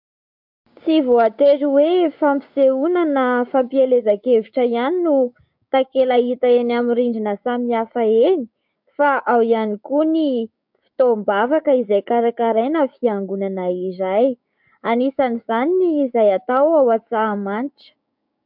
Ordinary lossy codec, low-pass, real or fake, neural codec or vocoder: MP3, 48 kbps; 5.4 kHz; fake; codec, 16 kHz, 6 kbps, DAC